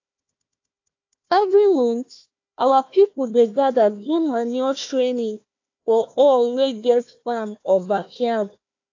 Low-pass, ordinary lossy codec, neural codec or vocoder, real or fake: 7.2 kHz; AAC, 48 kbps; codec, 16 kHz, 1 kbps, FunCodec, trained on Chinese and English, 50 frames a second; fake